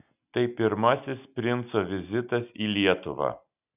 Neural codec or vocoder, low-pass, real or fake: none; 3.6 kHz; real